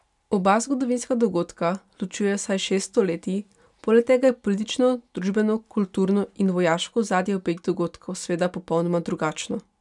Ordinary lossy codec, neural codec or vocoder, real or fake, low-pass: none; none; real; 10.8 kHz